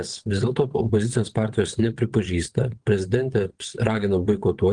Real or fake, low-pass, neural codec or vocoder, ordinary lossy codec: fake; 9.9 kHz; vocoder, 22.05 kHz, 80 mel bands, WaveNeXt; Opus, 24 kbps